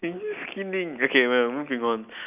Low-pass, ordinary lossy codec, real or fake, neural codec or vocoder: 3.6 kHz; none; real; none